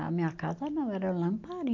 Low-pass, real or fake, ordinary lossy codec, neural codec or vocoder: 7.2 kHz; real; MP3, 48 kbps; none